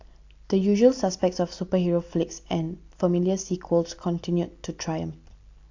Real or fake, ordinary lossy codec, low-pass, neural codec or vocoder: real; AAC, 48 kbps; 7.2 kHz; none